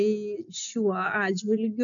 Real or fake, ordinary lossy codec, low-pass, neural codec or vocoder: real; MP3, 96 kbps; 7.2 kHz; none